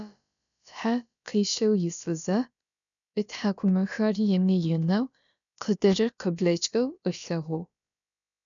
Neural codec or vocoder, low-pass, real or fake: codec, 16 kHz, about 1 kbps, DyCAST, with the encoder's durations; 7.2 kHz; fake